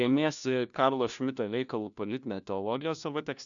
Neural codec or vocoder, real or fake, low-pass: codec, 16 kHz, 1 kbps, FunCodec, trained on LibriTTS, 50 frames a second; fake; 7.2 kHz